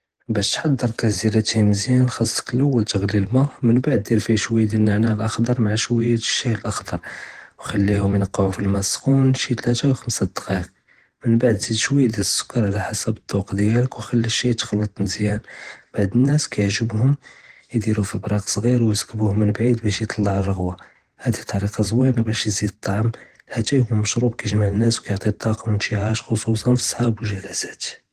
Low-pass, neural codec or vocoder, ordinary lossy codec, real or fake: 14.4 kHz; vocoder, 44.1 kHz, 128 mel bands every 512 samples, BigVGAN v2; Opus, 16 kbps; fake